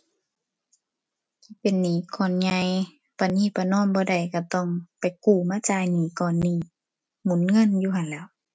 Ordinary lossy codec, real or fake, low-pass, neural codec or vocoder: none; real; none; none